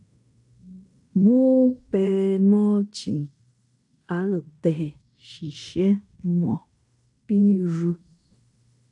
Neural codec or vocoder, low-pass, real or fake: codec, 16 kHz in and 24 kHz out, 0.9 kbps, LongCat-Audio-Codec, fine tuned four codebook decoder; 10.8 kHz; fake